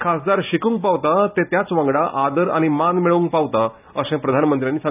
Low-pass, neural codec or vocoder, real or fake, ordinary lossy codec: 3.6 kHz; none; real; none